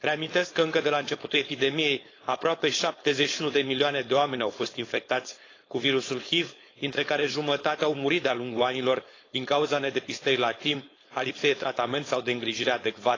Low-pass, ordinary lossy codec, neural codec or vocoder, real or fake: 7.2 kHz; AAC, 32 kbps; codec, 16 kHz, 4.8 kbps, FACodec; fake